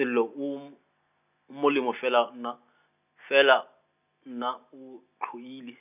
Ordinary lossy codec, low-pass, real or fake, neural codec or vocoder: none; 3.6 kHz; real; none